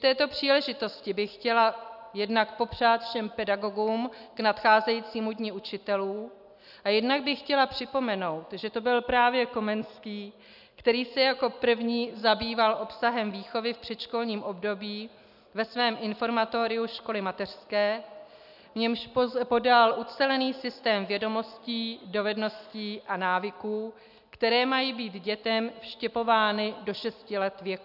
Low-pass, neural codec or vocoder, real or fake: 5.4 kHz; none; real